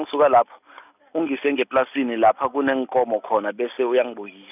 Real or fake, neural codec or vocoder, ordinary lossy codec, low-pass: real; none; none; 3.6 kHz